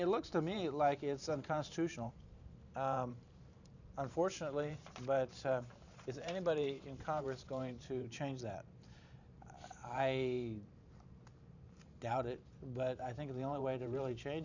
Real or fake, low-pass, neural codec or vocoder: fake; 7.2 kHz; vocoder, 44.1 kHz, 128 mel bands every 512 samples, BigVGAN v2